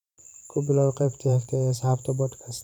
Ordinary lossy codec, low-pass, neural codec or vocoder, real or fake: none; 19.8 kHz; vocoder, 44.1 kHz, 128 mel bands every 512 samples, BigVGAN v2; fake